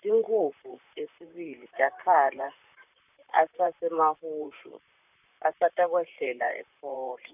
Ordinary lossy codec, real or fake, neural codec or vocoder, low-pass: none; fake; vocoder, 44.1 kHz, 128 mel bands, Pupu-Vocoder; 3.6 kHz